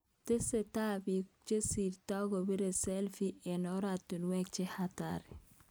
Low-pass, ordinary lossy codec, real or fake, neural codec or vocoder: none; none; real; none